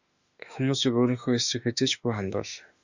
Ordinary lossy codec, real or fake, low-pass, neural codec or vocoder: Opus, 64 kbps; fake; 7.2 kHz; autoencoder, 48 kHz, 32 numbers a frame, DAC-VAE, trained on Japanese speech